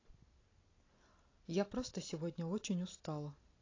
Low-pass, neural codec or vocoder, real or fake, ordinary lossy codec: 7.2 kHz; none; real; AAC, 32 kbps